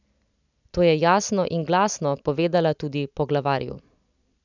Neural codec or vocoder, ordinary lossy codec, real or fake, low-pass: none; none; real; 7.2 kHz